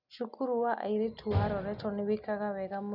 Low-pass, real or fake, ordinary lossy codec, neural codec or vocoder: 5.4 kHz; real; none; none